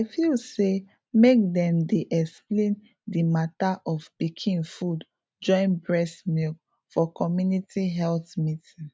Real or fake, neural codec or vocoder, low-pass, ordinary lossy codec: real; none; none; none